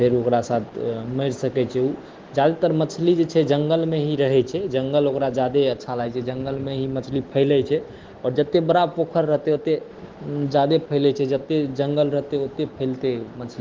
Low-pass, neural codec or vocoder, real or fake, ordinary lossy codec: 7.2 kHz; none; real; Opus, 16 kbps